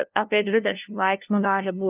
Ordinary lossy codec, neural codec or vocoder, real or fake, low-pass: Opus, 24 kbps; codec, 16 kHz, 0.5 kbps, FunCodec, trained on LibriTTS, 25 frames a second; fake; 3.6 kHz